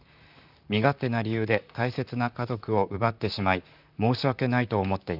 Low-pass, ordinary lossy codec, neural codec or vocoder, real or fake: 5.4 kHz; none; vocoder, 44.1 kHz, 128 mel bands, Pupu-Vocoder; fake